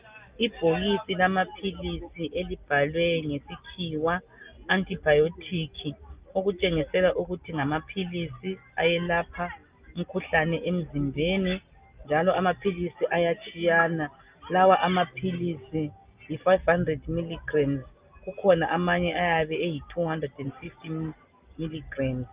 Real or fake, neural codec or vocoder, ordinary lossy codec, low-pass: real; none; Opus, 24 kbps; 3.6 kHz